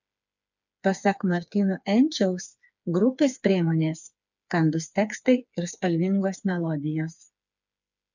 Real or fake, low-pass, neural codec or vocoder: fake; 7.2 kHz; codec, 16 kHz, 4 kbps, FreqCodec, smaller model